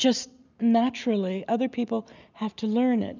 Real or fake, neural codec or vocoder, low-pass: real; none; 7.2 kHz